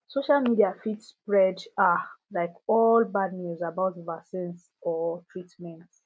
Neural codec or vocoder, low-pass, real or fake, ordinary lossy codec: none; none; real; none